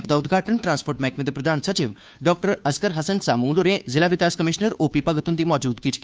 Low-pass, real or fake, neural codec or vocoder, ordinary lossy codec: none; fake; codec, 16 kHz, 2 kbps, FunCodec, trained on Chinese and English, 25 frames a second; none